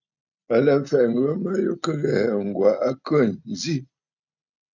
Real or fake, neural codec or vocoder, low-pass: real; none; 7.2 kHz